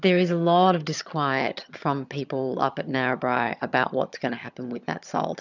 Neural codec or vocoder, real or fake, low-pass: vocoder, 22.05 kHz, 80 mel bands, HiFi-GAN; fake; 7.2 kHz